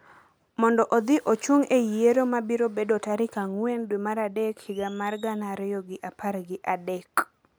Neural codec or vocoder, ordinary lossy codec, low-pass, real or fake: none; none; none; real